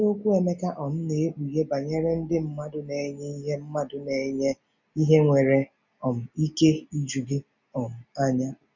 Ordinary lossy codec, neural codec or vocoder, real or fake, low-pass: none; none; real; 7.2 kHz